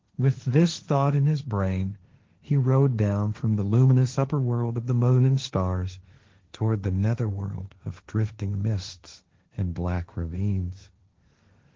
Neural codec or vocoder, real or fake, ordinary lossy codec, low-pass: codec, 16 kHz, 1.1 kbps, Voila-Tokenizer; fake; Opus, 16 kbps; 7.2 kHz